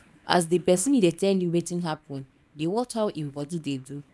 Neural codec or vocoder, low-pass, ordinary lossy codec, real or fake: codec, 24 kHz, 0.9 kbps, WavTokenizer, small release; none; none; fake